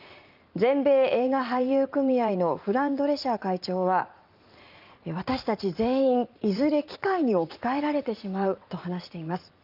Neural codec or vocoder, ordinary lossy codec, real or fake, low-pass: vocoder, 44.1 kHz, 80 mel bands, Vocos; Opus, 24 kbps; fake; 5.4 kHz